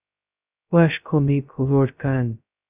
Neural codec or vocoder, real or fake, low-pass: codec, 16 kHz, 0.2 kbps, FocalCodec; fake; 3.6 kHz